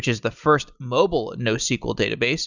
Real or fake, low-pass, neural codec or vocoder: real; 7.2 kHz; none